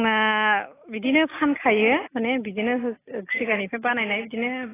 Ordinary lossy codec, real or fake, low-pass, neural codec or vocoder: AAC, 16 kbps; real; 3.6 kHz; none